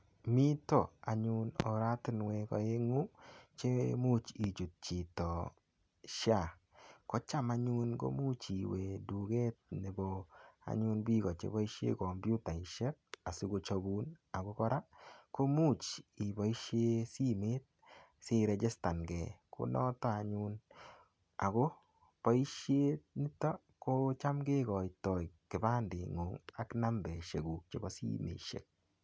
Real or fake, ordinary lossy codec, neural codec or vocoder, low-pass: real; none; none; none